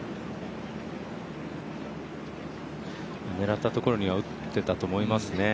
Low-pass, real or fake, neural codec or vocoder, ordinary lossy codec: none; real; none; none